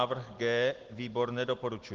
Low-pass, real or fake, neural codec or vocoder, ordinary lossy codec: 7.2 kHz; real; none; Opus, 16 kbps